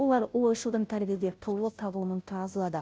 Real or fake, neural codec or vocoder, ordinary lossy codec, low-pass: fake; codec, 16 kHz, 0.5 kbps, FunCodec, trained on Chinese and English, 25 frames a second; none; none